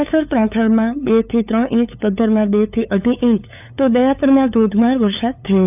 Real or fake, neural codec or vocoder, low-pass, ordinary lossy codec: fake; codec, 16 kHz, 8 kbps, FunCodec, trained on LibriTTS, 25 frames a second; 3.6 kHz; none